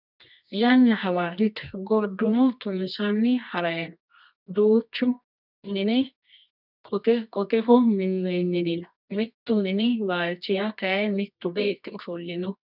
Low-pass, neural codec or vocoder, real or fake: 5.4 kHz; codec, 24 kHz, 0.9 kbps, WavTokenizer, medium music audio release; fake